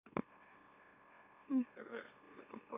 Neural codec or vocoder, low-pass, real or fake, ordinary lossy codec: autoencoder, 44.1 kHz, a latent of 192 numbers a frame, MeloTTS; 3.6 kHz; fake; none